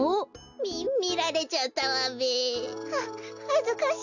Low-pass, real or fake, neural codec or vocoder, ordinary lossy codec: 7.2 kHz; real; none; none